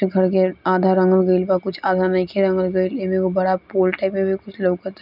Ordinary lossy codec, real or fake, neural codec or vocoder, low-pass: none; real; none; 5.4 kHz